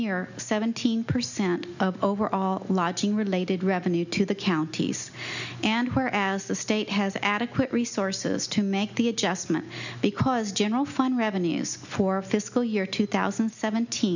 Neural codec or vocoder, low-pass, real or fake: none; 7.2 kHz; real